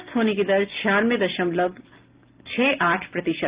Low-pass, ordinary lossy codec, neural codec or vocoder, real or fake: 3.6 kHz; Opus, 32 kbps; none; real